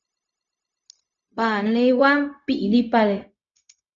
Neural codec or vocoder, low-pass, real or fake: codec, 16 kHz, 0.4 kbps, LongCat-Audio-Codec; 7.2 kHz; fake